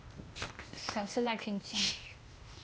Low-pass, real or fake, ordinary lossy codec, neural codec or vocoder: none; fake; none; codec, 16 kHz, 0.8 kbps, ZipCodec